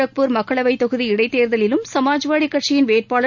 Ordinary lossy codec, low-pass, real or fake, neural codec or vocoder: none; 7.2 kHz; real; none